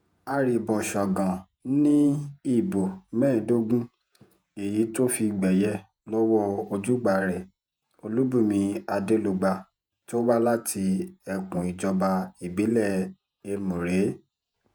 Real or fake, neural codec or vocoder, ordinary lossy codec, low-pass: fake; vocoder, 48 kHz, 128 mel bands, Vocos; none; none